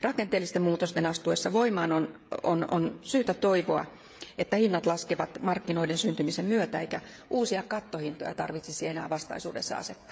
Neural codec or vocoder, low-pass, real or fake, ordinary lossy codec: codec, 16 kHz, 16 kbps, FreqCodec, smaller model; none; fake; none